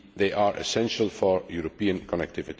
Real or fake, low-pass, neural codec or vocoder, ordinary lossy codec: real; none; none; none